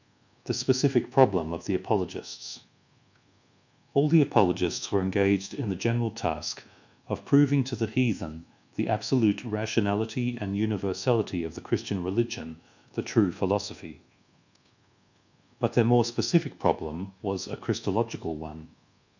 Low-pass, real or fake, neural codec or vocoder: 7.2 kHz; fake; codec, 24 kHz, 1.2 kbps, DualCodec